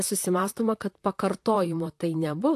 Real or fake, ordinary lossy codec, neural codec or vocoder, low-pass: fake; AAC, 64 kbps; vocoder, 44.1 kHz, 128 mel bands, Pupu-Vocoder; 14.4 kHz